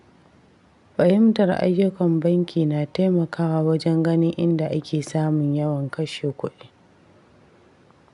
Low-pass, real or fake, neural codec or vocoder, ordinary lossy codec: 10.8 kHz; real; none; none